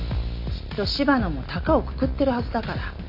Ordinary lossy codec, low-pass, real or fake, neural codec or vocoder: MP3, 48 kbps; 5.4 kHz; real; none